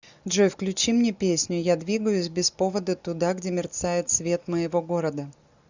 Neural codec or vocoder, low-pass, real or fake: none; 7.2 kHz; real